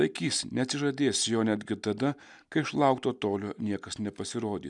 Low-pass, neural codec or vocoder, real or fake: 10.8 kHz; none; real